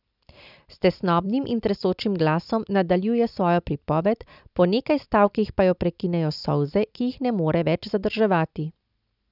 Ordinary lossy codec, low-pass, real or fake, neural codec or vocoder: none; 5.4 kHz; real; none